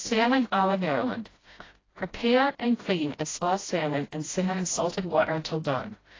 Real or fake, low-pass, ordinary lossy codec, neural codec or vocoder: fake; 7.2 kHz; AAC, 32 kbps; codec, 16 kHz, 0.5 kbps, FreqCodec, smaller model